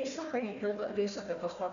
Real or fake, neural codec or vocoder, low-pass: fake; codec, 16 kHz, 1 kbps, FunCodec, trained on Chinese and English, 50 frames a second; 7.2 kHz